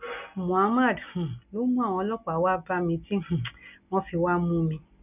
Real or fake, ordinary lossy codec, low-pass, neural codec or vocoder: real; none; 3.6 kHz; none